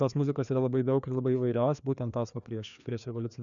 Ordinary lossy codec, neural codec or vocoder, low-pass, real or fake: MP3, 96 kbps; codec, 16 kHz, 2 kbps, FreqCodec, larger model; 7.2 kHz; fake